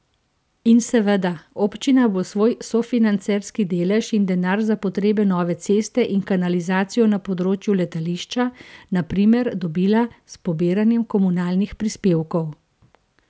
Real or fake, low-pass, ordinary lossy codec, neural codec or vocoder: real; none; none; none